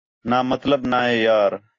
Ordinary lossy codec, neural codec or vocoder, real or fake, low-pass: AAC, 32 kbps; none; real; 7.2 kHz